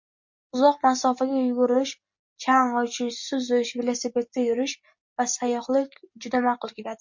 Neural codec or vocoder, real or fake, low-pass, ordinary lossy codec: none; real; 7.2 kHz; MP3, 48 kbps